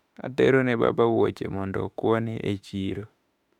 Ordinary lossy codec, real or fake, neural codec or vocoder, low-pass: none; fake; autoencoder, 48 kHz, 32 numbers a frame, DAC-VAE, trained on Japanese speech; 19.8 kHz